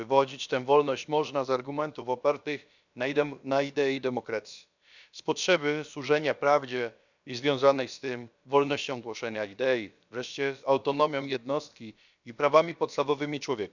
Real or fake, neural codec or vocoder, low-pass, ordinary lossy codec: fake; codec, 16 kHz, about 1 kbps, DyCAST, with the encoder's durations; 7.2 kHz; none